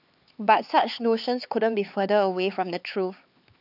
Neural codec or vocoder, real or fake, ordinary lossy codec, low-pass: codec, 16 kHz, 4 kbps, X-Codec, HuBERT features, trained on LibriSpeech; fake; none; 5.4 kHz